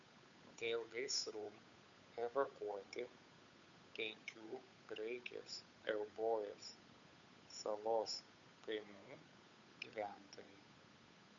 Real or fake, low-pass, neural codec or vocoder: fake; 7.2 kHz; codec, 16 kHz, 8 kbps, FunCodec, trained on Chinese and English, 25 frames a second